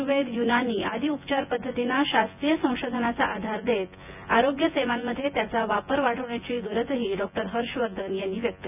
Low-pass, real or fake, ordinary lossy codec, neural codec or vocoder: 3.6 kHz; fake; none; vocoder, 24 kHz, 100 mel bands, Vocos